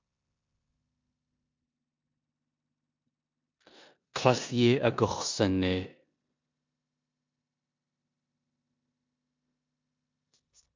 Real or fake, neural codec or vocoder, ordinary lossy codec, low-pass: fake; codec, 16 kHz in and 24 kHz out, 0.9 kbps, LongCat-Audio-Codec, four codebook decoder; MP3, 64 kbps; 7.2 kHz